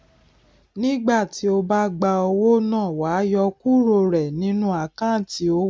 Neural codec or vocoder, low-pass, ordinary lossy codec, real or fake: none; none; none; real